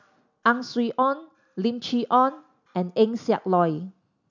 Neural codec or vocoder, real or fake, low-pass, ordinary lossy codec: none; real; 7.2 kHz; none